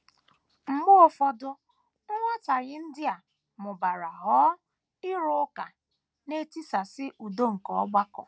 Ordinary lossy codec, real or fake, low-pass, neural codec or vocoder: none; real; none; none